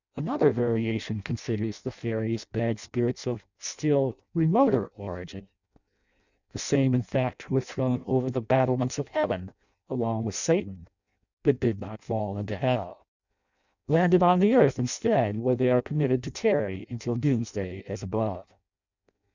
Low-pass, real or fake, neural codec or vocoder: 7.2 kHz; fake; codec, 16 kHz in and 24 kHz out, 0.6 kbps, FireRedTTS-2 codec